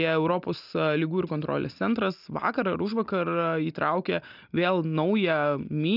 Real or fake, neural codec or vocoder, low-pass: real; none; 5.4 kHz